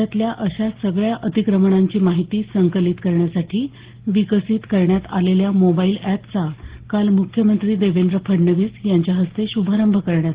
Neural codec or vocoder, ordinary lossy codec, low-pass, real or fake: none; Opus, 16 kbps; 3.6 kHz; real